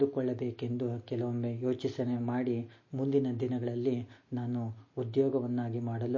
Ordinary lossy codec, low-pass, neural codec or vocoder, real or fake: MP3, 32 kbps; 7.2 kHz; none; real